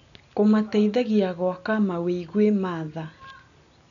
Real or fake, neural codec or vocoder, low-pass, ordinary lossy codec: real; none; 7.2 kHz; none